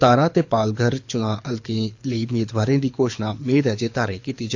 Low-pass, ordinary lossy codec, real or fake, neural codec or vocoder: 7.2 kHz; AAC, 48 kbps; fake; codec, 24 kHz, 6 kbps, HILCodec